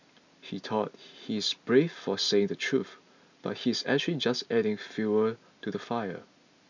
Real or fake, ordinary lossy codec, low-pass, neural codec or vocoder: real; none; 7.2 kHz; none